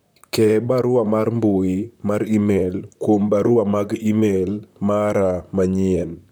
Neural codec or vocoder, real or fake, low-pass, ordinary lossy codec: vocoder, 44.1 kHz, 128 mel bands, Pupu-Vocoder; fake; none; none